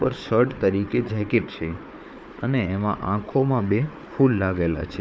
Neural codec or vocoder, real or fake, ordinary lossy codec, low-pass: codec, 16 kHz, 4 kbps, FunCodec, trained on Chinese and English, 50 frames a second; fake; none; none